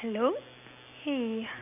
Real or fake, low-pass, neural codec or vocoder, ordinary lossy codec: real; 3.6 kHz; none; none